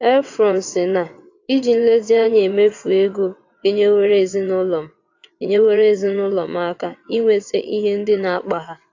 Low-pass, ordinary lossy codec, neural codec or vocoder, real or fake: 7.2 kHz; AAC, 48 kbps; vocoder, 22.05 kHz, 80 mel bands, WaveNeXt; fake